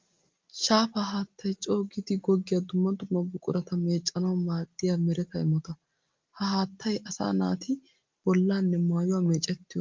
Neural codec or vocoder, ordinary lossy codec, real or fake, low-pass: none; Opus, 24 kbps; real; 7.2 kHz